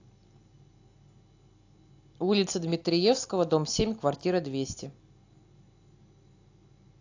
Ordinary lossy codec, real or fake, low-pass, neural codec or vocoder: AAC, 48 kbps; real; 7.2 kHz; none